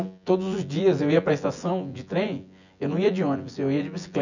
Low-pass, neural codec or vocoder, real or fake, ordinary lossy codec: 7.2 kHz; vocoder, 24 kHz, 100 mel bands, Vocos; fake; none